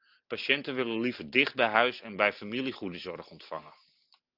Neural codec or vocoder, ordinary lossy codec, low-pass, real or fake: none; Opus, 24 kbps; 5.4 kHz; real